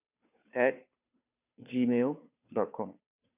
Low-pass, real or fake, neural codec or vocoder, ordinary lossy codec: 3.6 kHz; fake; codec, 16 kHz, 2 kbps, FunCodec, trained on Chinese and English, 25 frames a second; none